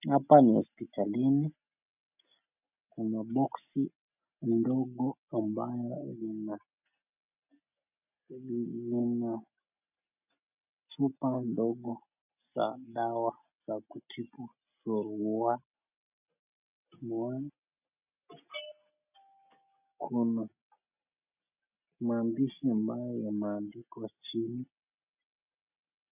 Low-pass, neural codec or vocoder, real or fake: 3.6 kHz; none; real